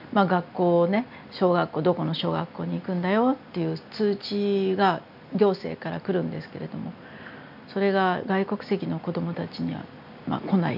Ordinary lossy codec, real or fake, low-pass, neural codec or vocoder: none; real; 5.4 kHz; none